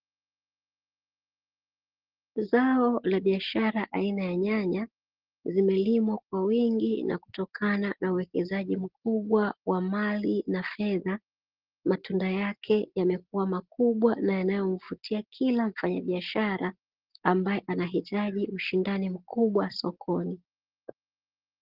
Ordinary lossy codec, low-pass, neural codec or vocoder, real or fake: Opus, 16 kbps; 5.4 kHz; none; real